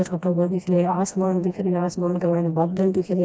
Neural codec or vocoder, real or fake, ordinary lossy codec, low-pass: codec, 16 kHz, 1 kbps, FreqCodec, smaller model; fake; none; none